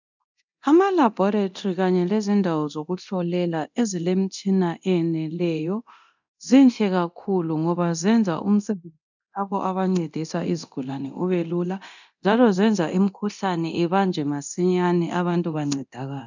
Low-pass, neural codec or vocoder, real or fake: 7.2 kHz; codec, 24 kHz, 0.9 kbps, DualCodec; fake